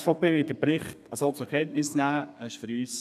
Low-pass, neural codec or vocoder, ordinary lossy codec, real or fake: 14.4 kHz; codec, 32 kHz, 1.9 kbps, SNAC; none; fake